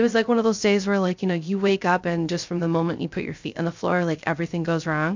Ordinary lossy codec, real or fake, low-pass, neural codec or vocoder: MP3, 64 kbps; fake; 7.2 kHz; codec, 16 kHz, 0.3 kbps, FocalCodec